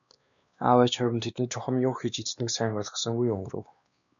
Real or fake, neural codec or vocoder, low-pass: fake; codec, 16 kHz, 2 kbps, X-Codec, WavLM features, trained on Multilingual LibriSpeech; 7.2 kHz